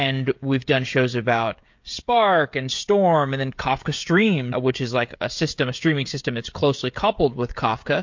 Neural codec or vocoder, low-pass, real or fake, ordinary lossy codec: codec, 16 kHz, 16 kbps, FreqCodec, smaller model; 7.2 kHz; fake; MP3, 48 kbps